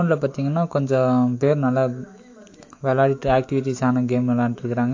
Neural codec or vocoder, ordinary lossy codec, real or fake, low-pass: autoencoder, 48 kHz, 128 numbers a frame, DAC-VAE, trained on Japanese speech; AAC, 48 kbps; fake; 7.2 kHz